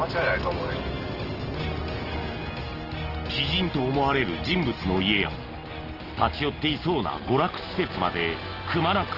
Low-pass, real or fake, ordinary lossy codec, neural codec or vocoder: 5.4 kHz; real; Opus, 16 kbps; none